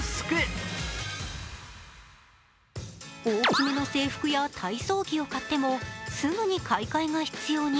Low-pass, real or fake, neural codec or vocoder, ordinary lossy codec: none; real; none; none